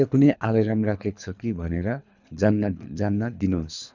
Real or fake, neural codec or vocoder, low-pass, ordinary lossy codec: fake; codec, 24 kHz, 3 kbps, HILCodec; 7.2 kHz; none